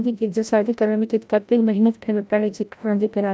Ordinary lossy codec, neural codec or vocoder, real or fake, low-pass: none; codec, 16 kHz, 0.5 kbps, FreqCodec, larger model; fake; none